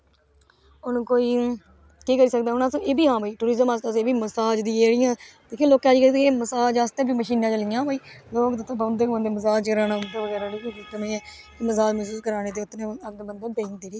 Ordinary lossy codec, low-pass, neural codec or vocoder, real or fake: none; none; none; real